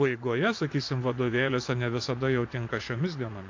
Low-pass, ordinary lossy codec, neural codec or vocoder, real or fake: 7.2 kHz; AAC, 48 kbps; vocoder, 24 kHz, 100 mel bands, Vocos; fake